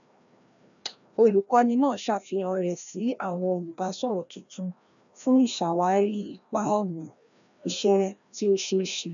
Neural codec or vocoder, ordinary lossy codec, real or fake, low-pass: codec, 16 kHz, 1 kbps, FreqCodec, larger model; none; fake; 7.2 kHz